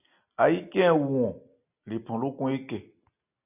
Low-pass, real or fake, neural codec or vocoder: 3.6 kHz; real; none